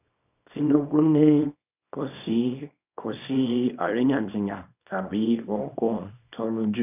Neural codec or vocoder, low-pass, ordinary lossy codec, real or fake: codec, 24 kHz, 0.9 kbps, WavTokenizer, small release; 3.6 kHz; none; fake